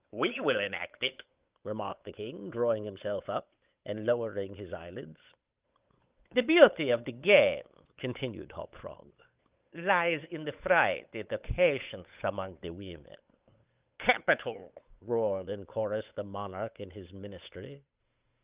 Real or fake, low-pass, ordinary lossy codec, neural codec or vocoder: fake; 3.6 kHz; Opus, 24 kbps; codec, 16 kHz, 8 kbps, FunCodec, trained on Chinese and English, 25 frames a second